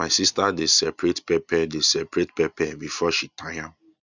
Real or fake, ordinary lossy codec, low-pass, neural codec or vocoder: real; none; 7.2 kHz; none